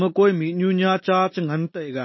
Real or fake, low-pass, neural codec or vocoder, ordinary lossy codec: real; 7.2 kHz; none; MP3, 24 kbps